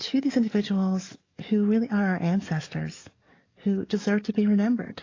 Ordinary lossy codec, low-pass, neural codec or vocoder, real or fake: AAC, 32 kbps; 7.2 kHz; codec, 44.1 kHz, 7.8 kbps, DAC; fake